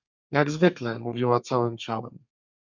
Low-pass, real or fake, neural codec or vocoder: 7.2 kHz; fake; codec, 32 kHz, 1.9 kbps, SNAC